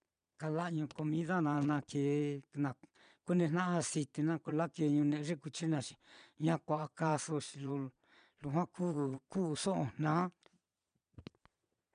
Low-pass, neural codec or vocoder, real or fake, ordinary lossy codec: 9.9 kHz; vocoder, 22.05 kHz, 80 mel bands, Vocos; fake; none